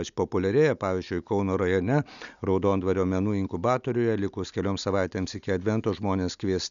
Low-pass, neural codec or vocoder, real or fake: 7.2 kHz; none; real